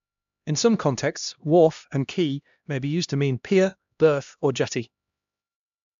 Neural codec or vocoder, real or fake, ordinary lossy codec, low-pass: codec, 16 kHz, 1 kbps, X-Codec, HuBERT features, trained on LibriSpeech; fake; MP3, 64 kbps; 7.2 kHz